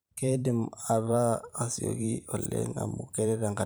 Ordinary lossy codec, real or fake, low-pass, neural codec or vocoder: none; fake; none; vocoder, 44.1 kHz, 128 mel bands every 512 samples, BigVGAN v2